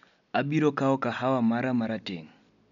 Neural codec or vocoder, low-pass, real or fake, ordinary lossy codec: none; 7.2 kHz; real; MP3, 96 kbps